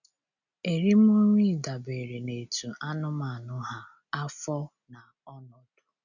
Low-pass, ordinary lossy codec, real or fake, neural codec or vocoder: 7.2 kHz; none; real; none